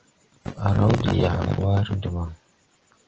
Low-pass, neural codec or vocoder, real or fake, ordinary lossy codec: 7.2 kHz; none; real; Opus, 16 kbps